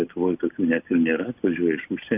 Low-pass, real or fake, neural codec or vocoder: 3.6 kHz; real; none